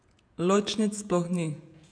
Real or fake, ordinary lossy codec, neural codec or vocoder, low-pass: fake; MP3, 96 kbps; vocoder, 48 kHz, 128 mel bands, Vocos; 9.9 kHz